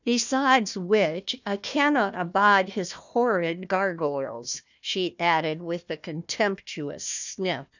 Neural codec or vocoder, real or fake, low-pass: codec, 16 kHz, 1 kbps, FunCodec, trained on Chinese and English, 50 frames a second; fake; 7.2 kHz